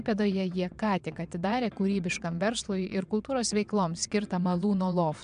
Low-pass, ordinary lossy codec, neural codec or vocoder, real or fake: 9.9 kHz; AAC, 96 kbps; vocoder, 22.05 kHz, 80 mel bands, WaveNeXt; fake